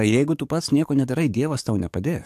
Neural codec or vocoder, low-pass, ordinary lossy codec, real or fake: codec, 44.1 kHz, 7.8 kbps, DAC; 14.4 kHz; AAC, 96 kbps; fake